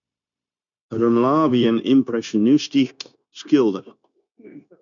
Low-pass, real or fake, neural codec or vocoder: 7.2 kHz; fake; codec, 16 kHz, 0.9 kbps, LongCat-Audio-Codec